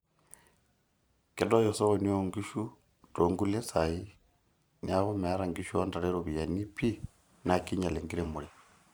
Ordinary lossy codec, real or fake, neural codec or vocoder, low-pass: none; fake; vocoder, 44.1 kHz, 128 mel bands every 512 samples, BigVGAN v2; none